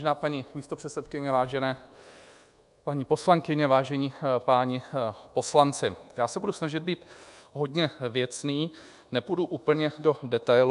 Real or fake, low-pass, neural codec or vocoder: fake; 10.8 kHz; codec, 24 kHz, 1.2 kbps, DualCodec